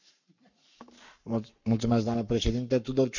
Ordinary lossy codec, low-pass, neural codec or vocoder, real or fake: none; 7.2 kHz; codec, 44.1 kHz, 7.8 kbps, Pupu-Codec; fake